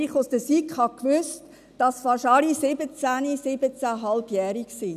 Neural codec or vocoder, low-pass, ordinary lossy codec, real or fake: none; 14.4 kHz; none; real